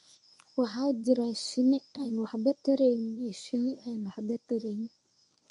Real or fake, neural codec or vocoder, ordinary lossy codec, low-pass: fake; codec, 24 kHz, 0.9 kbps, WavTokenizer, medium speech release version 1; none; 10.8 kHz